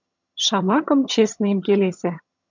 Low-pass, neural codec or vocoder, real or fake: 7.2 kHz; vocoder, 22.05 kHz, 80 mel bands, HiFi-GAN; fake